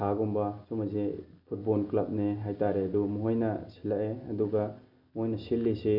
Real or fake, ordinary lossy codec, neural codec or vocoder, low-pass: real; none; none; 5.4 kHz